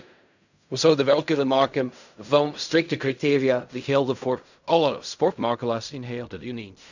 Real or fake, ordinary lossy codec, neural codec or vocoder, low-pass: fake; none; codec, 16 kHz in and 24 kHz out, 0.4 kbps, LongCat-Audio-Codec, fine tuned four codebook decoder; 7.2 kHz